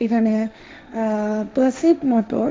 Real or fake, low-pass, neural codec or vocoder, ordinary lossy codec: fake; none; codec, 16 kHz, 1.1 kbps, Voila-Tokenizer; none